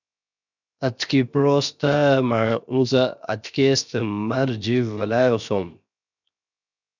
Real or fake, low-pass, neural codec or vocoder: fake; 7.2 kHz; codec, 16 kHz, 0.7 kbps, FocalCodec